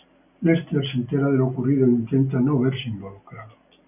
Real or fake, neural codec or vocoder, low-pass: real; none; 3.6 kHz